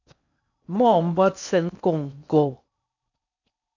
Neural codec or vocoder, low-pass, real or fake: codec, 16 kHz in and 24 kHz out, 0.6 kbps, FocalCodec, streaming, 4096 codes; 7.2 kHz; fake